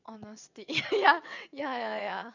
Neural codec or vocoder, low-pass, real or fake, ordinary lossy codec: vocoder, 22.05 kHz, 80 mel bands, WaveNeXt; 7.2 kHz; fake; none